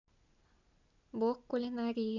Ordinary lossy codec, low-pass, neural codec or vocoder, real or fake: none; 7.2 kHz; none; real